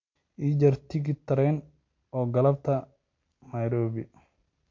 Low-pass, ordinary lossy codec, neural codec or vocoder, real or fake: 7.2 kHz; MP3, 48 kbps; none; real